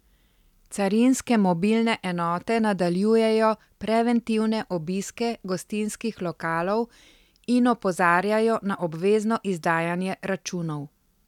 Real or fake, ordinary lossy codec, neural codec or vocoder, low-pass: real; none; none; 19.8 kHz